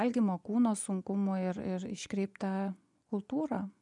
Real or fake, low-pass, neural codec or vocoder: fake; 10.8 kHz; vocoder, 24 kHz, 100 mel bands, Vocos